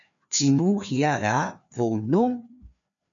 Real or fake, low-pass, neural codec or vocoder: fake; 7.2 kHz; codec, 16 kHz, 2 kbps, FreqCodec, larger model